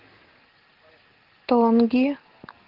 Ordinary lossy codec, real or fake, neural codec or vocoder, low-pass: Opus, 16 kbps; real; none; 5.4 kHz